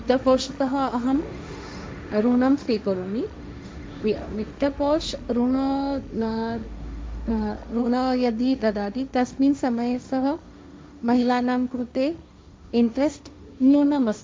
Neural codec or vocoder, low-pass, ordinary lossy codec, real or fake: codec, 16 kHz, 1.1 kbps, Voila-Tokenizer; none; none; fake